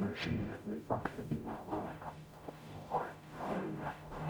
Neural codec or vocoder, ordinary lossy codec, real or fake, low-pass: codec, 44.1 kHz, 0.9 kbps, DAC; none; fake; none